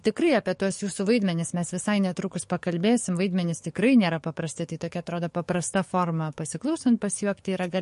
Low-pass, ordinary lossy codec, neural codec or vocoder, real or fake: 14.4 kHz; MP3, 48 kbps; codec, 44.1 kHz, 7.8 kbps, DAC; fake